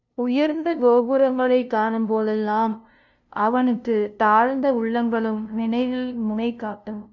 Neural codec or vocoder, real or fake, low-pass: codec, 16 kHz, 0.5 kbps, FunCodec, trained on LibriTTS, 25 frames a second; fake; 7.2 kHz